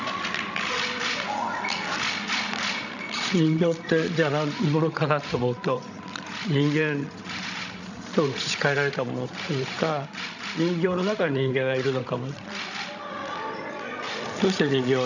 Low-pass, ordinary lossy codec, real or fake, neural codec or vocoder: 7.2 kHz; none; fake; codec, 16 kHz, 16 kbps, FreqCodec, larger model